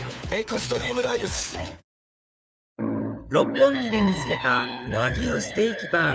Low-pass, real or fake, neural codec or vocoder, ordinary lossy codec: none; fake; codec, 16 kHz, 4 kbps, FunCodec, trained on LibriTTS, 50 frames a second; none